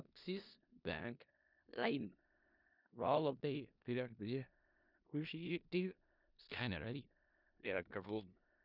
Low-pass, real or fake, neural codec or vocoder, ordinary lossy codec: 5.4 kHz; fake; codec, 16 kHz in and 24 kHz out, 0.4 kbps, LongCat-Audio-Codec, four codebook decoder; none